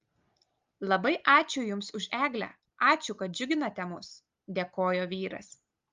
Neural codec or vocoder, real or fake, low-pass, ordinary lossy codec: none; real; 7.2 kHz; Opus, 32 kbps